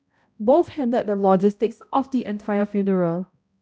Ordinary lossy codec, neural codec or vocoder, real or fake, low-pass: none; codec, 16 kHz, 0.5 kbps, X-Codec, HuBERT features, trained on balanced general audio; fake; none